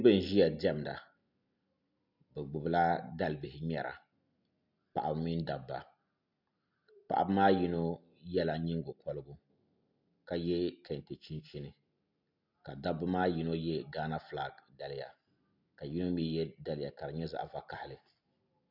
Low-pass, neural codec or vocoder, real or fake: 5.4 kHz; none; real